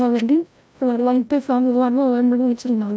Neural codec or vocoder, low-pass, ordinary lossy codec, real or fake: codec, 16 kHz, 0.5 kbps, FreqCodec, larger model; none; none; fake